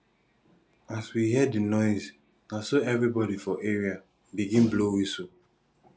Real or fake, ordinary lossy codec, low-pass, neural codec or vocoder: real; none; none; none